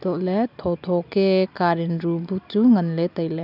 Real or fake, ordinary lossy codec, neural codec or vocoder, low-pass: real; none; none; 5.4 kHz